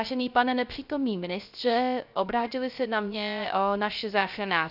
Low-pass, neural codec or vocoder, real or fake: 5.4 kHz; codec, 16 kHz, 0.3 kbps, FocalCodec; fake